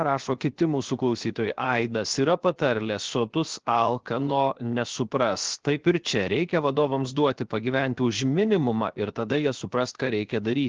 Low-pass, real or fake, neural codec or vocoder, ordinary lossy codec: 7.2 kHz; fake; codec, 16 kHz, 0.7 kbps, FocalCodec; Opus, 16 kbps